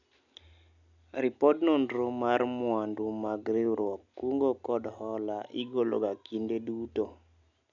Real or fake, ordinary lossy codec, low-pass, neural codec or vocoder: real; none; 7.2 kHz; none